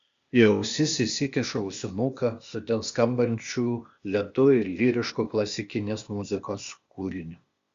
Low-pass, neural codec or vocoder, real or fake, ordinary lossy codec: 7.2 kHz; codec, 16 kHz, 0.8 kbps, ZipCodec; fake; Opus, 64 kbps